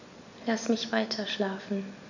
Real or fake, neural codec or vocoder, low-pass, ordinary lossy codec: real; none; 7.2 kHz; none